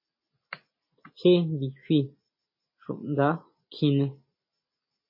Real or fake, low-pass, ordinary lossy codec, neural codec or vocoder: real; 5.4 kHz; MP3, 24 kbps; none